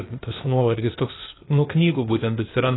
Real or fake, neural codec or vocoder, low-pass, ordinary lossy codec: fake; codec, 16 kHz, 0.8 kbps, ZipCodec; 7.2 kHz; AAC, 16 kbps